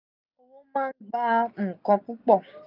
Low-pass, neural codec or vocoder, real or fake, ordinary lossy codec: 5.4 kHz; none; real; none